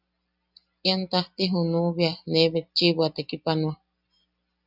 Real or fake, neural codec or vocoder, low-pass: real; none; 5.4 kHz